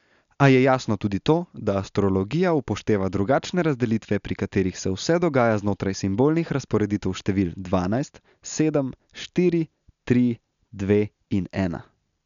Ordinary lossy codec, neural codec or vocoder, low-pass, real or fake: none; none; 7.2 kHz; real